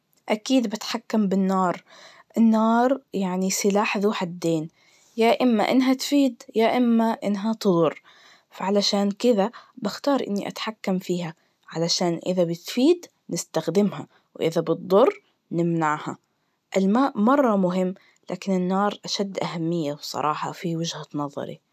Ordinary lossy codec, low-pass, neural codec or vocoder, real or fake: none; 14.4 kHz; none; real